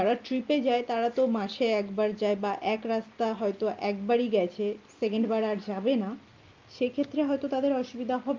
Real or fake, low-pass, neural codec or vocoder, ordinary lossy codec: real; 7.2 kHz; none; Opus, 24 kbps